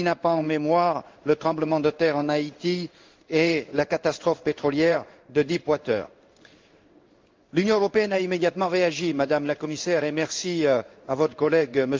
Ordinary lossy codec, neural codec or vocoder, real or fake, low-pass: Opus, 24 kbps; codec, 16 kHz in and 24 kHz out, 1 kbps, XY-Tokenizer; fake; 7.2 kHz